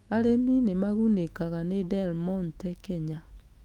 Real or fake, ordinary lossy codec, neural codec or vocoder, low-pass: fake; Opus, 32 kbps; autoencoder, 48 kHz, 128 numbers a frame, DAC-VAE, trained on Japanese speech; 19.8 kHz